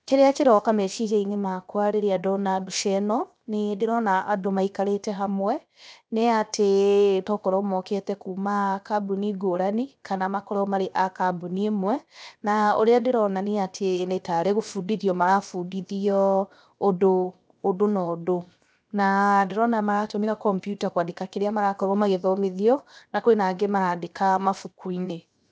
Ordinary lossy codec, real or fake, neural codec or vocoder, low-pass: none; fake; codec, 16 kHz, 0.7 kbps, FocalCodec; none